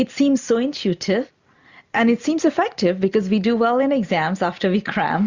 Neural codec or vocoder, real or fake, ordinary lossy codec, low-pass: none; real; Opus, 64 kbps; 7.2 kHz